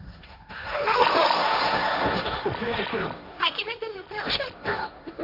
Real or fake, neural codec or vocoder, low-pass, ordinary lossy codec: fake; codec, 16 kHz, 1.1 kbps, Voila-Tokenizer; 5.4 kHz; none